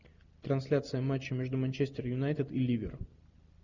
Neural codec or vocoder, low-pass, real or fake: none; 7.2 kHz; real